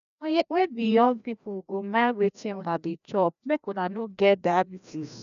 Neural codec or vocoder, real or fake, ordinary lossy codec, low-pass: codec, 16 kHz, 1 kbps, FreqCodec, larger model; fake; none; 7.2 kHz